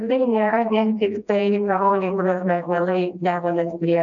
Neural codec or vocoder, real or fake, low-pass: codec, 16 kHz, 1 kbps, FreqCodec, smaller model; fake; 7.2 kHz